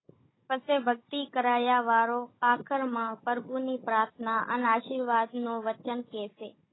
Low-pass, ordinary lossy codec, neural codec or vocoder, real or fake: 7.2 kHz; AAC, 16 kbps; codec, 16 kHz, 16 kbps, FunCodec, trained on Chinese and English, 50 frames a second; fake